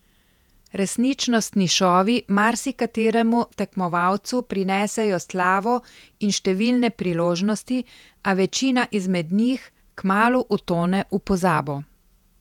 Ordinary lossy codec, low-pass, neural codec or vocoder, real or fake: none; 19.8 kHz; vocoder, 48 kHz, 128 mel bands, Vocos; fake